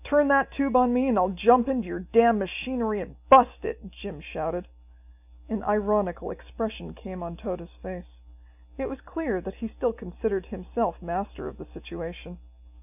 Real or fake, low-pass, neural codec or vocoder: real; 3.6 kHz; none